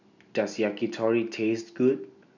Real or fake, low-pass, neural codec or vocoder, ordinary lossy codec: real; 7.2 kHz; none; none